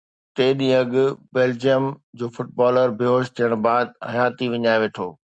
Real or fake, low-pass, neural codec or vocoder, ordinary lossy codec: real; 9.9 kHz; none; Opus, 64 kbps